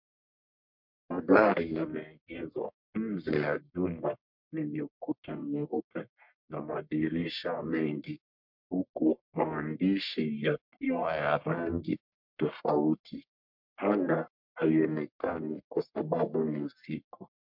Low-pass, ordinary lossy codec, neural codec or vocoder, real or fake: 5.4 kHz; MP3, 48 kbps; codec, 44.1 kHz, 1.7 kbps, Pupu-Codec; fake